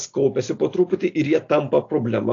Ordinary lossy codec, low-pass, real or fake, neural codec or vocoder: AAC, 48 kbps; 7.2 kHz; real; none